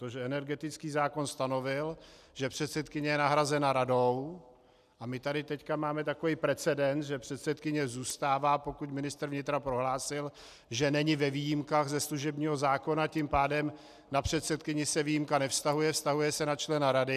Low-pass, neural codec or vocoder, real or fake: 14.4 kHz; none; real